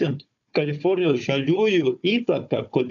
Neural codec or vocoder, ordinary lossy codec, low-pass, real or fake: codec, 16 kHz, 4 kbps, FunCodec, trained on Chinese and English, 50 frames a second; AAC, 64 kbps; 7.2 kHz; fake